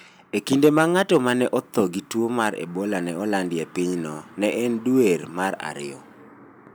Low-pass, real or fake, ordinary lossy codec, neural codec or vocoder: none; real; none; none